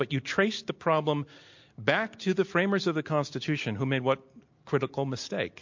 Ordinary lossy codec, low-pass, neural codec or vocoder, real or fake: MP3, 48 kbps; 7.2 kHz; none; real